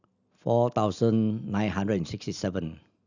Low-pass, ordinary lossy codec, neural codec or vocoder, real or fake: 7.2 kHz; none; none; real